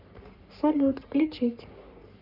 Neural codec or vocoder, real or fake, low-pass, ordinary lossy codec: codec, 44.1 kHz, 3.4 kbps, Pupu-Codec; fake; 5.4 kHz; none